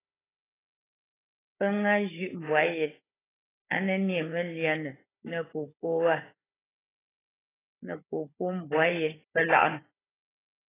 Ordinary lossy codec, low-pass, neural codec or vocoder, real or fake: AAC, 16 kbps; 3.6 kHz; codec, 16 kHz, 16 kbps, FunCodec, trained on Chinese and English, 50 frames a second; fake